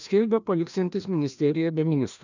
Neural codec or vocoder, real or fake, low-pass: codec, 16 kHz, 1 kbps, FreqCodec, larger model; fake; 7.2 kHz